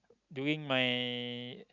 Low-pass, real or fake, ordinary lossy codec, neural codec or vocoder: 7.2 kHz; real; Opus, 64 kbps; none